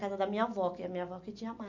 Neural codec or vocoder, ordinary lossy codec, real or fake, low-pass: none; MP3, 48 kbps; real; 7.2 kHz